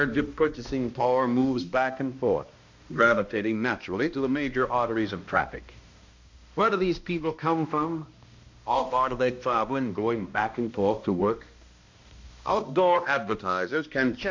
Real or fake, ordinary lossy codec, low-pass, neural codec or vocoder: fake; MP3, 48 kbps; 7.2 kHz; codec, 16 kHz, 1 kbps, X-Codec, HuBERT features, trained on balanced general audio